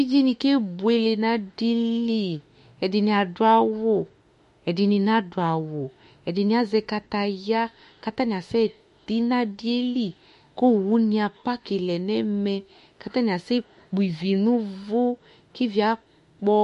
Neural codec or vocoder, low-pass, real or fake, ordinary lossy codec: autoencoder, 48 kHz, 32 numbers a frame, DAC-VAE, trained on Japanese speech; 14.4 kHz; fake; MP3, 48 kbps